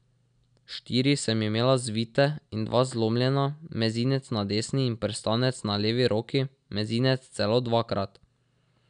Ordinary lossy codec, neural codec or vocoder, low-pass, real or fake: none; none; 9.9 kHz; real